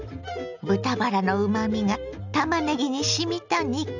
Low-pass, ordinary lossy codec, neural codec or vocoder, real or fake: 7.2 kHz; none; none; real